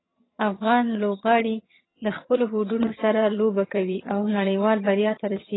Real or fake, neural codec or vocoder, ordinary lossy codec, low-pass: fake; vocoder, 22.05 kHz, 80 mel bands, HiFi-GAN; AAC, 16 kbps; 7.2 kHz